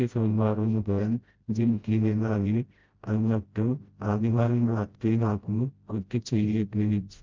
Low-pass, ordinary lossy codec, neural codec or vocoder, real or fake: 7.2 kHz; Opus, 24 kbps; codec, 16 kHz, 0.5 kbps, FreqCodec, smaller model; fake